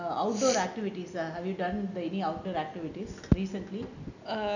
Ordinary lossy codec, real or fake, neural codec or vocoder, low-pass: none; real; none; 7.2 kHz